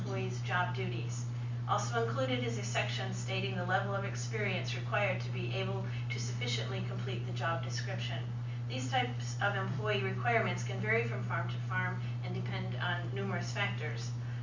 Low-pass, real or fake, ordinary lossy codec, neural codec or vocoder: 7.2 kHz; real; MP3, 48 kbps; none